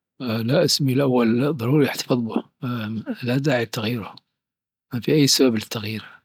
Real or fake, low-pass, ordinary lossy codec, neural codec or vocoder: real; 19.8 kHz; none; none